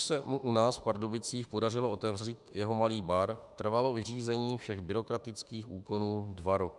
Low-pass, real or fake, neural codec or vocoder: 10.8 kHz; fake; autoencoder, 48 kHz, 32 numbers a frame, DAC-VAE, trained on Japanese speech